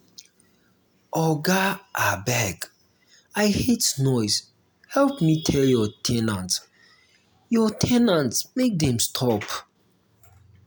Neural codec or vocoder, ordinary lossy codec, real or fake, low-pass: none; none; real; none